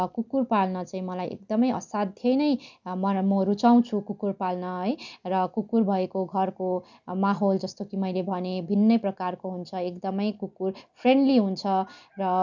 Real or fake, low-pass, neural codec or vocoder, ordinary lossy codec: real; 7.2 kHz; none; none